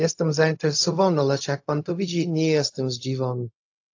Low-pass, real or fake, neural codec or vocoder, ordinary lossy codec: 7.2 kHz; fake; codec, 16 kHz, 0.4 kbps, LongCat-Audio-Codec; AAC, 48 kbps